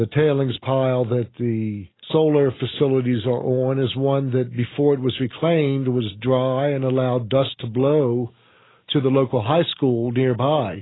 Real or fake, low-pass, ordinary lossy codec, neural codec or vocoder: real; 7.2 kHz; AAC, 16 kbps; none